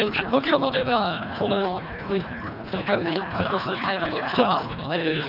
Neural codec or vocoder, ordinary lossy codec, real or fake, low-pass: codec, 24 kHz, 1.5 kbps, HILCodec; none; fake; 5.4 kHz